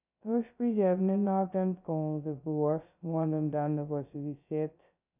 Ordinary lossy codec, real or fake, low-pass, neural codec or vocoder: none; fake; 3.6 kHz; codec, 16 kHz, 0.2 kbps, FocalCodec